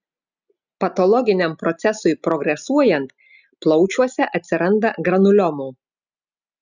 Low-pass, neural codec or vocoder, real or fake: 7.2 kHz; none; real